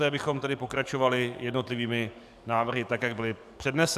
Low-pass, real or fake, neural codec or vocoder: 14.4 kHz; fake; codec, 44.1 kHz, 7.8 kbps, DAC